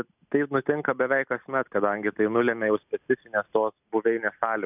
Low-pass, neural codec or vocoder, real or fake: 3.6 kHz; none; real